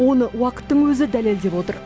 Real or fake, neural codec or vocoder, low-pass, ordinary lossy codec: real; none; none; none